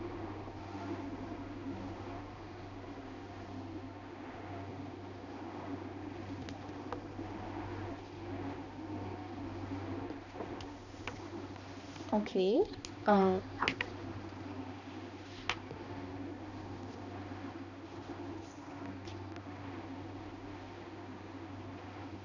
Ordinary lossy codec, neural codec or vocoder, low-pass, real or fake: none; codec, 16 kHz, 2 kbps, X-Codec, HuBERT features, trained on balanced general audio; 7.2 kHz; fake